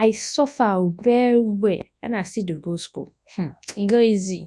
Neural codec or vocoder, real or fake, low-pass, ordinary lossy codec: codec, 24 kHz, 0.9 kbps, WavTokenizer, large speech release; fake; none; none